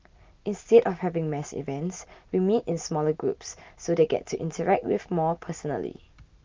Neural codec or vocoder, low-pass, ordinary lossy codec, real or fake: none; 7.2 kHz; Opus, 24 kbps; real